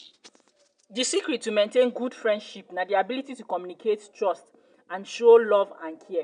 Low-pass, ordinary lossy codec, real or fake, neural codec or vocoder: 9.9 kHz; MP3, 96 kbps; real; none